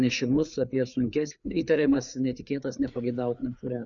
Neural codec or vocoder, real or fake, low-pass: codec, 16 kHz, 4 kbps, FreqCodec, larger model; fake; 7.2 kHz